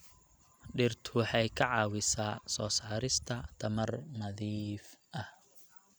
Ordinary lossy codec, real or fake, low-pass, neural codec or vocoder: none; real; none; none